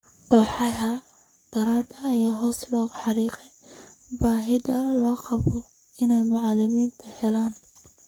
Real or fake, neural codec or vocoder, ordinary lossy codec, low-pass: fake; codec, 44.1 kHz, 3.4 kbps, Pupu-Codec; none; none